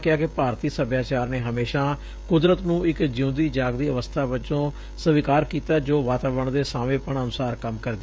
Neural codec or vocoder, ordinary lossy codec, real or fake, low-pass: codec, 16 kHz, 16 kbps, FreqCodec, smaller model; none; fake; none